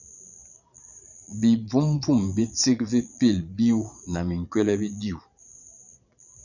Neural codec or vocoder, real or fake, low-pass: vocoder, 22.05 kHz, 80 mel bands, Vocos; fake; 7.2 kHz